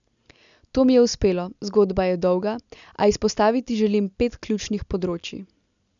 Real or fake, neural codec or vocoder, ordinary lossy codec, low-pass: real; none; none; 7.2 kHz